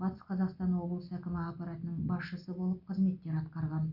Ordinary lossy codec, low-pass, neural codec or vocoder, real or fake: none; 5.4 kHz; none; real